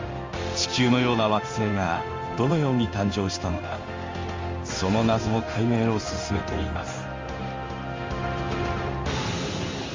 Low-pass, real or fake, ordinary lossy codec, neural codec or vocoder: 7.2 kHz; fake; Opus, 32 kbps; codec, 16 kHz in and 24 kHz out, 1 kbps, XY-Tokenizer